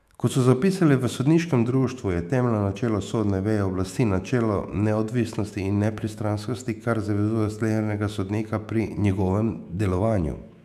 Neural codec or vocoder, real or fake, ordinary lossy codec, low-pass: autoencoder, 48 kHz, 128 numbers a frame, DAC-VAE, trained on Japanese speech; fake; none; 14.4 kHz